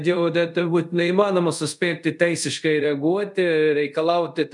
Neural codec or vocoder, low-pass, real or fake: codec, 24 kHz, 0.5 kbps, DualCodec; 10.8 kHz; fake